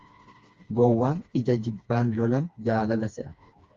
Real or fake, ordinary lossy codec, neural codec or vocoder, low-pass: fake; Opus, 32 kbps; codec, 16 kHz, 2 kbps, FreqCodec, smaller model; 7.2 kHz